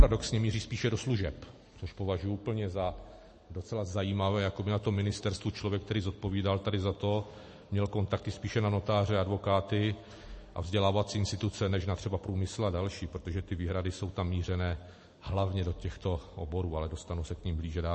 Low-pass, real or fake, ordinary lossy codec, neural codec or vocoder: 10.8 kHz; real; MP3, 32 kbps; none